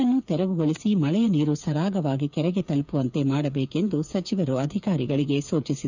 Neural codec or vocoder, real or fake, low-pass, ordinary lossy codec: codec, 16 kHz, 8 kbps, FreqCodec, smaller model; fake; 7.2 kHz; none